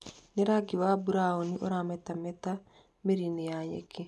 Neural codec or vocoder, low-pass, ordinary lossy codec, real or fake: none; none; none; real